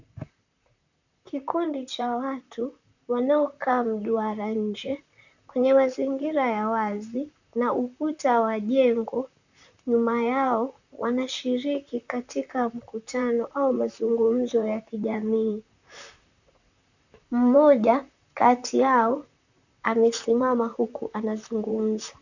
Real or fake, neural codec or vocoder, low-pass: fake; vocoder, 44.1 kHz, 128 mel bands, Pupu-Vocoder; 7.2 kHz